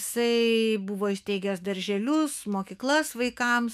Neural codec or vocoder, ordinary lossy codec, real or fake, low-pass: autoencoder, 48 kHz, 128 numbers a frame, DAC-VAE, trained on Japanese speech; MP3, 96 kbps; fake; 14.4 kHz